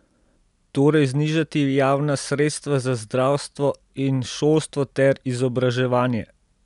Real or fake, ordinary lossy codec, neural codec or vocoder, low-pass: real; none; none; 10.8 kHz